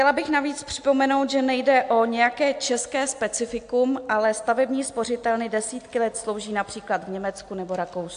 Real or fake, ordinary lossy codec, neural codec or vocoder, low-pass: real; AAC, 64 kbps; none; 9.9 kHz